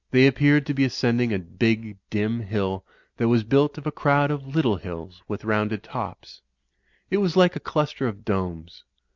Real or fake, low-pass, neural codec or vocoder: real; 7.2 kHz; none